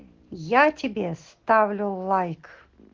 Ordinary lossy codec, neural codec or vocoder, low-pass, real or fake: Opus, 16 kbps; none; 7.2 kHz; real